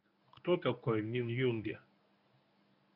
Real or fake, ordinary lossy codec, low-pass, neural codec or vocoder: fake; none; 5.4 kHz; codec, 24 kHz, 0.9 kbps, WavTokenizer, medium speech release version 1